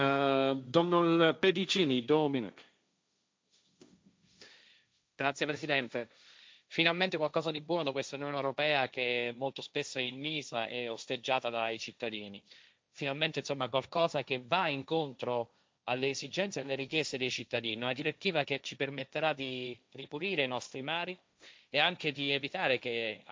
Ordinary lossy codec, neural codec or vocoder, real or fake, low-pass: none; codec, 16 kHz, 1.1 kbps, Voila-Tokenizer; fake; none